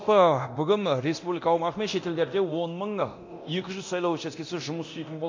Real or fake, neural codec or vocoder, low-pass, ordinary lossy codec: fake; codec, 24 kHz, 0.9 kbps, DualCodec; 7.2 kHz; MP3, 48 kbps